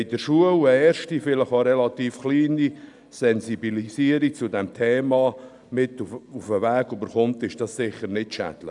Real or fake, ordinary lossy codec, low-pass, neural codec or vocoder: real; none; 10.8 kHz; none